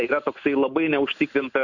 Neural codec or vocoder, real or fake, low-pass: none; real; 7.2 kHz